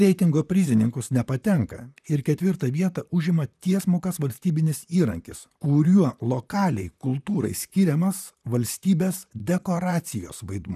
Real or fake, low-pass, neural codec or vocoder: fake; 14.4 kHz; vocoder, 44.1 kHz, 128 mel bands, Pupu-Vocoder